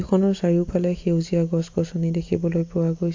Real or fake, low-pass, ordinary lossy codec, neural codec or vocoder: real; 7.2 kHz; AAC, 48 kbps; none